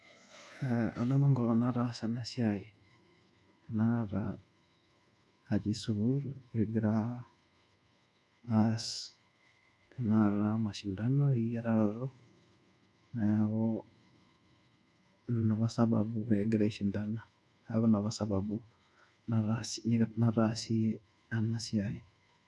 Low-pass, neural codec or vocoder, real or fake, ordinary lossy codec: none; codec, 24 kHz, 1.2 kbps, DualCodec; fake; none